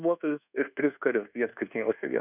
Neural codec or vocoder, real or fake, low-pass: codec, 24 kHz, 1.2 kbps, DualCodec; fake; 3.6 kHz